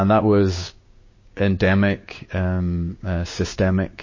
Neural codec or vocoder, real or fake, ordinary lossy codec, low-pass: codec, 16 kHz, 6 kbps, DAC; fake; MP3, 32 kbps; 7.2 kHz